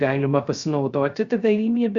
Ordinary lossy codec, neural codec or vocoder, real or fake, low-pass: Opus, 64 kbps; codec, 16 kHz, 0.3 kbps, FocalCodec; fake; 7.2 kHz